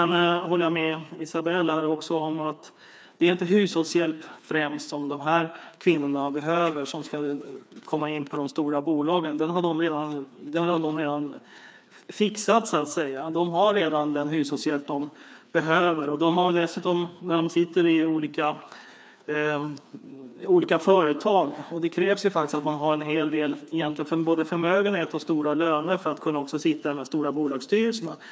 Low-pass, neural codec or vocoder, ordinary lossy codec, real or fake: none; codec, 16 kHz, 2 kbps, FreqCodec, larger model; none; fake